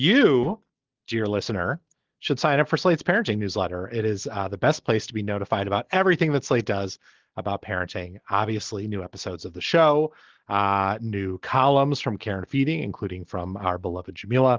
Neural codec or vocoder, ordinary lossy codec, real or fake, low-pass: none; Opus, 16 kbps; real; 7.2 kHz